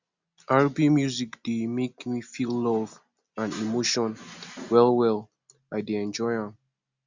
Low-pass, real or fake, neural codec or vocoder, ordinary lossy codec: 7.2 kHz; real; none; Opus, 64 kbps